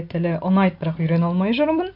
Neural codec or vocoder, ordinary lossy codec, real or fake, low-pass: none; MP3, 48 kbps; real; 5.4 kHz